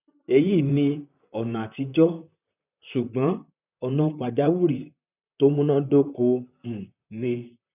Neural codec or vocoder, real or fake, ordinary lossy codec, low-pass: vocoder, 44.1 kHz, 128 mel bands, Pupu-Vocoder; fake; none; 3.6 kHz